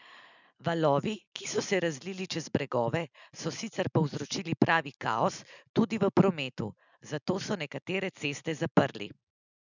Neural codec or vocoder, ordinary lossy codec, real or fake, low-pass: none; none; real; 7.2 kHz